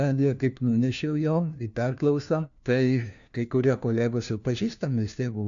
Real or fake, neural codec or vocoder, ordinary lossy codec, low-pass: fake; codec, 16 kHz, 1 kbps, FunCodec, trained on LibriTTS, 50 frames a second; AAC, 64 kbps; 7.2 kHz